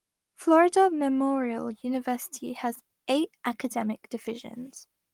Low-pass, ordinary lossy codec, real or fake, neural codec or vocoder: 19.8 kHz; Opus, 32 kbps; fake; codec, 44.1 kHz, 7.8 kbps, Pupu-Codec